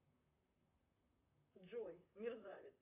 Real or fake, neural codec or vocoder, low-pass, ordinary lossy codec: fake; vocoder, 44.1 kHz, 128 mel bands, Pupu-Vocoder; 3.6 kHz; AAC, 32 kbps